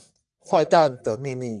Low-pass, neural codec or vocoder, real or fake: 10.8 kHz; codec, 44.1 kHz, 1.7 kbps, Pupu-Codec; fake